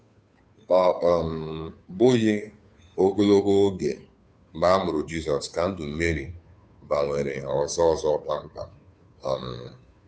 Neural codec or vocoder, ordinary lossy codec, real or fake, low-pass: codec, 16 kHz, 2 kbps, FunCodec, trained on Chinese and English, 25 frames a second; none; fake; none